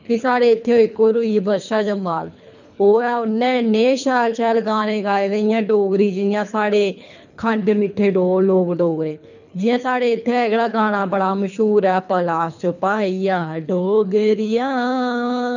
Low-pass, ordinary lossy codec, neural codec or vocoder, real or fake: 7.2 kHz; none; codec, 24 kHz, 3 kbps, HILCodec; fake